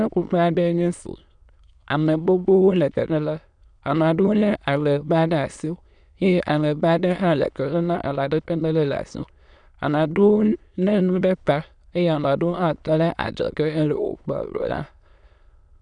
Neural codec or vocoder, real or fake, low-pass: autoencoder, 22.05 kHz, a latent of 192 numbers a frame, VITS, trained on many speakers; fake; 9.9 kHz